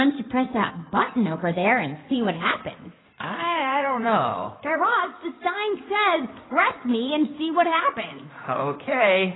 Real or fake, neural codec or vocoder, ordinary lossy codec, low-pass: fake; codec, 16 kHz in and 24 kHz out, 2.2 kbps, FireRedTTS-2 codec; AAC, 16 kbps; 7.2 kHz